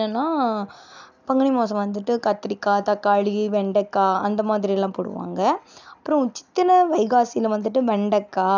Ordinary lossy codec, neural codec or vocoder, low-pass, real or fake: none; none; 7.2 kHz; real